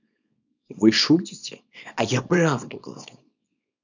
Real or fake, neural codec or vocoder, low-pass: fake; codec, 24 kHz, 0.9 kbps, WavTokenizer, small release; 7.2 kHz